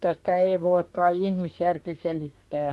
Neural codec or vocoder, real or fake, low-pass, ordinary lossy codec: codec, 24 kHz, 1 kbps, SNAC; fake; none; none